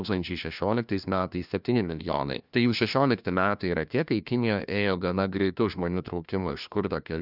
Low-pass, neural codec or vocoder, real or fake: 5.4 kHz; codec, 16 kHz, 1 kbps, FunCodec, trained on LibriTTS, 50 frames a second; fake